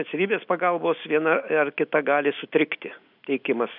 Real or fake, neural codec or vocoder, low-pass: real; none; 5.4 kHz